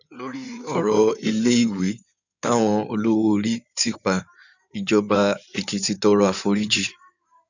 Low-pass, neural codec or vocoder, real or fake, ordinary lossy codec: 7.2 kHz; codec, 16 kHz in and 24 kHz out, 2.2 kbps, FireRedTTS-2 codec; fake; none